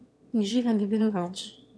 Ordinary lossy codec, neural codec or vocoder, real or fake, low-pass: none; autoencoder, 22.05 kHz, a latent of 192 numbers a frame, VITS, trained on one speaker; fake; none